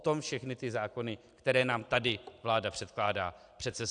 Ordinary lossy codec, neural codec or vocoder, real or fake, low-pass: AAC, 64 kbps; none; real; 9.9 kHz